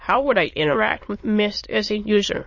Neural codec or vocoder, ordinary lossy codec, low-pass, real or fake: autoencoder, 22.05 kHz, a latent of 192 numbers a frame, VITS, trained on many speakers; MP3, 32 kbps; 7.2 kHz; fake